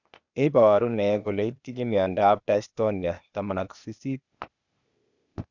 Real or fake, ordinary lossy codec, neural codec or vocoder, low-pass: fake; none; codec, 16 kHz, 0.8 kbps, ZipCodec; 7.2 kHz